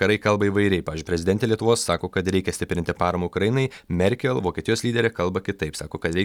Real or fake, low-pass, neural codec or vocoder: real; 19.8 kHz; none